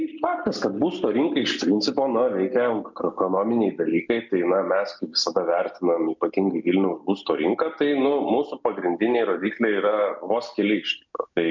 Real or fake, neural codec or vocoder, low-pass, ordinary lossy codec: real; none; 7.2 kHz; MP3, 64 kbps